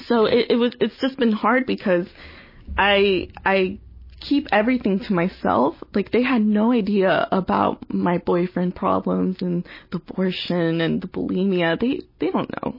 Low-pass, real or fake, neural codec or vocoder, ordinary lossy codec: 5.4 kHz; real; none; MP3, 24 kbps